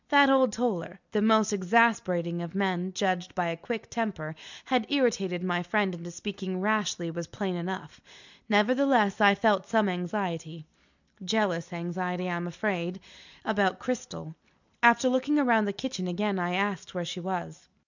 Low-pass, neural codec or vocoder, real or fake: 7.2 kHz; none; real